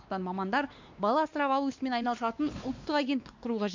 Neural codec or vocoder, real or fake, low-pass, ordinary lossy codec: codec, 16 kHz, 2 kbps, X-Codec, WavLM features, trained on Multilingual LibriSpeech; fake; 7.2 kHz; MP3, 64 kbps